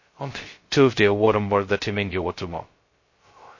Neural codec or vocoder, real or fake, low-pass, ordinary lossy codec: codec, 16 kHz, 0.2 kbps, FocalCodec; fake; 7.2 kHz; MP3, 32 kbps